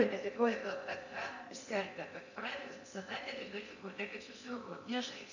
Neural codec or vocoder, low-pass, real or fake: codec, 16 kHz in and 24 kHz out, 0.8 kbps, FocalCodec, streaming, 65536 codes; 7.2 kHz; fake